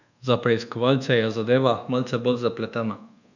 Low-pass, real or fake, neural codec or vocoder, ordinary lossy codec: 7.2 kHz; fake; codec, 24 kHz, 1.2 kbps, DualCodec; none